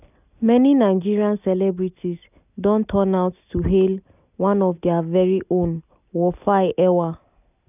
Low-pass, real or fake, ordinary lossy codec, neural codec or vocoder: 3.6 kHz; real; none; none